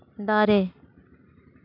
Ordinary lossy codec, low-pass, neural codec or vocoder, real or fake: AAC, 32 kbps; 5.4 kHz; none; real